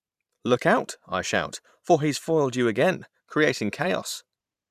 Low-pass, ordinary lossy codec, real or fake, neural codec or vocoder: 14.4 kHz; none; fake; vocoder, 44.1 kHz, 128 mel bands every 512 samples, BigVGAN v2